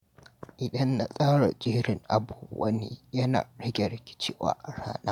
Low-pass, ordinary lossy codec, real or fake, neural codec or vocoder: 19.8 kHz; MP3, 96 kbps; real; none